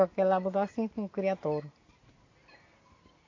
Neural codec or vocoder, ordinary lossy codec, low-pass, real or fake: none; AAC, 32 kbps; 7.2 kHz; real